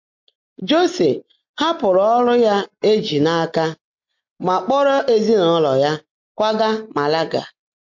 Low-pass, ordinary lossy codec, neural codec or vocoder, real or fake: 7.2 kHz; MP3, 48 kbps; none; real